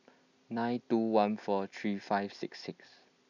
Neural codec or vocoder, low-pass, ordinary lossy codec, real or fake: none; 7.2 kHz; none; real